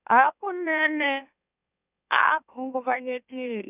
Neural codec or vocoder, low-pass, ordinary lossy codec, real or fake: autoencoder, 44.1 kHz, a latent of 192 numbers a frame, MeloTTS; 3.6 kHz; none; fake